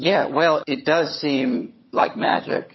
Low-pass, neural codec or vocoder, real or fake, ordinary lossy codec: 7.2 kHz; vocoder, 22.05 kHz, 80 mel bands, HiFi-GAN; fake; MP3, 24 kbps